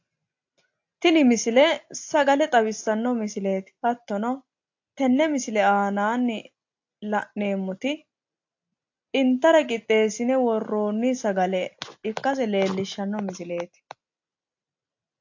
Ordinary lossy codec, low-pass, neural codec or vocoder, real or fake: AAC, 48 kbps; 7.2 kHz; none; real